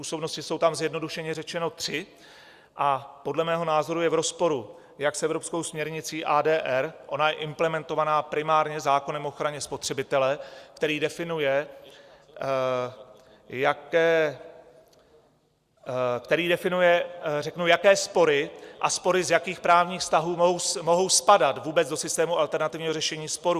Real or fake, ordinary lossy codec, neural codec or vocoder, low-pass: real; Opus, 64 kbps; none; 14.4 kHz